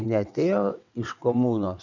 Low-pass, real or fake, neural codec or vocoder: 7.2 kHz; fake; vocoder, 22.05 kHz, 80 mel bands, WaveNeXt